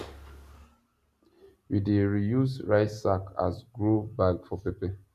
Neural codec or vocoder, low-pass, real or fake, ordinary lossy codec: none; 14.4 kHz; real; none